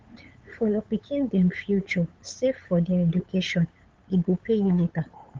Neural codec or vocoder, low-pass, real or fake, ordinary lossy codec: codec, 16 kHz, 8 kbps, FunCodec, trained on LibriTTS, 25 frames a second; 7.2 kHz; fake; Opus, 16 kbps